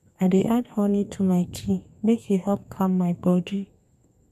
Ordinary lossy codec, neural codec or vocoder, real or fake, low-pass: none; codec, 32 kHz, 1.9 kbps, SNAC; fake; 14.4 kHz